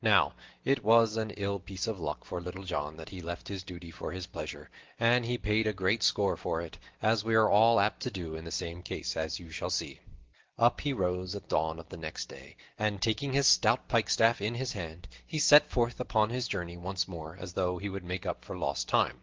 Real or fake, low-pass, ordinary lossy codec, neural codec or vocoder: real; 7.2 kHz; Opus, 16 kbps; none